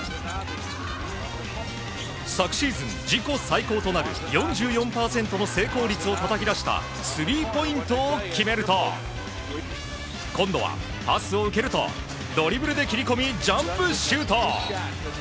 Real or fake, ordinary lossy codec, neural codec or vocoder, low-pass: real; none; none; none